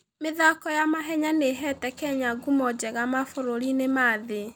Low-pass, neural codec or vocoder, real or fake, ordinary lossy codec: none; none; real; none